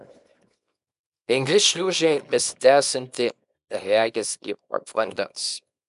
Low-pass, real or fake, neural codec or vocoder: 10.8 kHz; fake; codec, 24 kHz, 0.9 kbps, WavTokenizer, small release